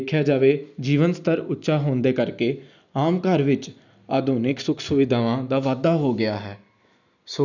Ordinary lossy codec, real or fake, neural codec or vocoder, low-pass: none; real; none; 7.2 kHz